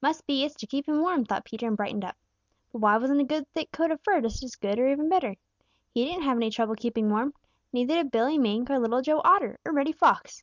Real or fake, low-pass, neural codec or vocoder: real; 7.2 kHz; none